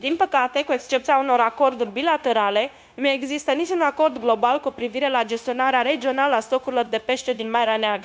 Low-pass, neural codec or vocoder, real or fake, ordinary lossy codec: none; codec, 16 kHz, 0.9 kbps, LongCat-Audio-Codec; fake; none